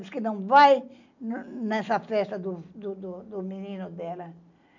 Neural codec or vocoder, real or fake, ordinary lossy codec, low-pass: none; real; none; 7.2 kHz